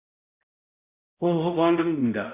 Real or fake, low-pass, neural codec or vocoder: fake; 3.6 kHz; codec, 16 kHz, 0.5 kbps, X-Codec, HuBERT features, trained on balanced general audio